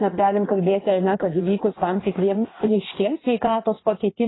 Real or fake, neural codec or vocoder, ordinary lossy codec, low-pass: fake; codec, 16 kHz in and 24 kHz out, 1.1 kbps, FireRedTTS-2 codec; AAC, 16 kbps; 7.2 kHz